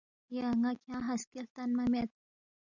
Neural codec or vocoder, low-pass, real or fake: none; 7.2 kHz; real